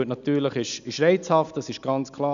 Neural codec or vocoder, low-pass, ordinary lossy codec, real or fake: none; 7.2 kHz; none; real